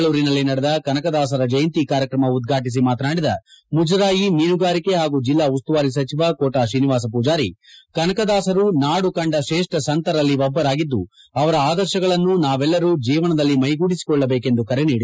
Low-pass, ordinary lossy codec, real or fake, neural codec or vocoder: none; none; real; none